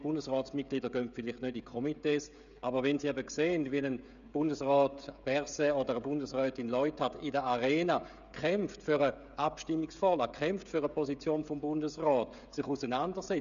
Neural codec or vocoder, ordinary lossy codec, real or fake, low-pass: codec, 16 kHz, 16 kbps, FreqCodec, smaller model; none; fake; 7.2 kHz